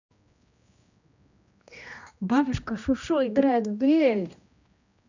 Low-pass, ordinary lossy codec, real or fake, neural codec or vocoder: 7.2 kHz; none; fake; codec, 16 kHz, 1 kbps, X-Codec, HuBERT features, trained on general audio